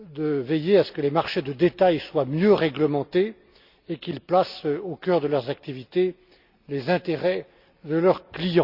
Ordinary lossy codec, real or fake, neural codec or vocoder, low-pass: Opus, 64 kbps; real; none; 5.4 kHz